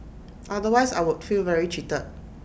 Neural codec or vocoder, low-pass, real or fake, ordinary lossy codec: none; none; real; none